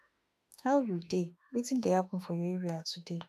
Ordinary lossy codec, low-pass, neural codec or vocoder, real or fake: none; 14.4 kHz; autoencoder, 48 kHz, 32 numbers a frame, DAC-VAE, trained on Japanese speech; fake